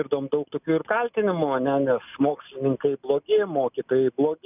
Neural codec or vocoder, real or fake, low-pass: none; real; 3.6 kHz